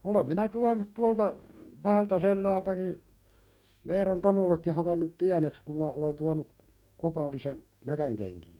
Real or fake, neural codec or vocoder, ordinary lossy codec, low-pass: fake; codec, 44.1 kHz, 2.6 kbps, DAC; none; 19.8 kHz